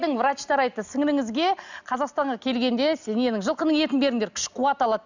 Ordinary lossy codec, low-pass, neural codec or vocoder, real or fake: none; 7.2 kHz; none; real